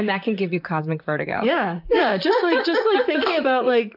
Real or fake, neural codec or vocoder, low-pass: fake; vocoder, 44.1 kHz, 128 mel bands, Pupu-Vocoder; 5.4 kHz